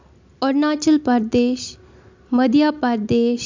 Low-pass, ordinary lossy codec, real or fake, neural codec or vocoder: 7.2 kHz; MP3, 64 kbps; real; none